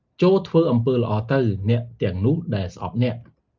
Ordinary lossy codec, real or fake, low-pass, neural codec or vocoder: Opus, 24 kbps; real; 7.2 kHz; none